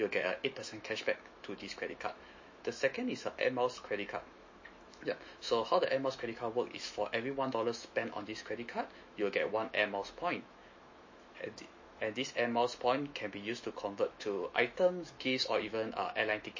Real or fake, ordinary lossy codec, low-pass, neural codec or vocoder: fake; MP3, 32 kbps; 7.2 kHz; autoencoder, 48 kHz, 128 numbers a frame, DAC-VAE, trained on Japanese speech